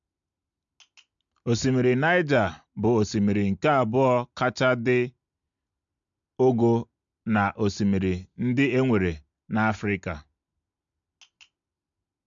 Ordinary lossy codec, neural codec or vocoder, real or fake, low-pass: MP3, 64 kbps; none; real; 7.2 kHz